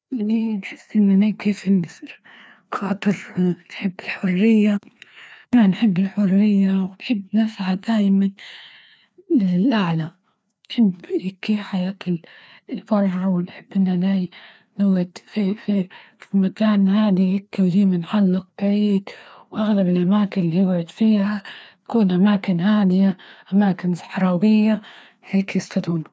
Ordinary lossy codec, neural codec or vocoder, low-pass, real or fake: none; codec, 16 kHz, 2 kbps, FreqCodec, larger model; none; fake